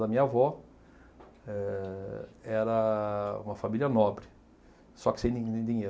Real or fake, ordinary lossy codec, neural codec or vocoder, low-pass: real; none; none; none